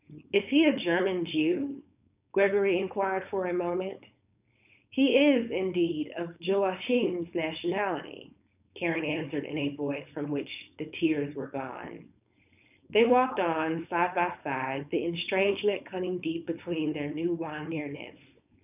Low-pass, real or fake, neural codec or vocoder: 3.6 kHz; fake; codec, 16 kHz, 4.8 kbps, FACodec